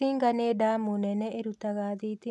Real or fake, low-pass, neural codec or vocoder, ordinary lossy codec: real; none; none; none